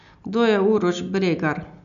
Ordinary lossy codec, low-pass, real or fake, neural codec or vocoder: none; 7.2 kHz; real; none